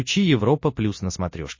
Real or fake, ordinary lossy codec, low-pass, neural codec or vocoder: real; MP3, 32 kbps; 7.2 kHz; none